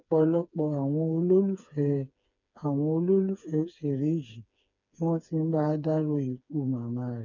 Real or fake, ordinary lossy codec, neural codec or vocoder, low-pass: fake; none; codec, 16 kHz, 4 kbps, FreqCodec, smaller model; 7.2 kHz